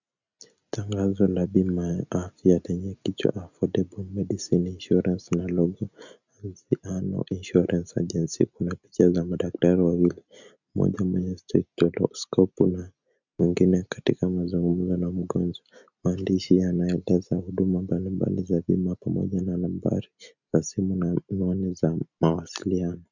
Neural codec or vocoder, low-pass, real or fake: none; 7.2 kHz; real